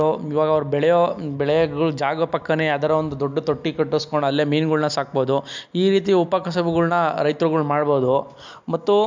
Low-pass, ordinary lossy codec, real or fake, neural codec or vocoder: 7.2 kHz; MP3, 64 kbps; real; none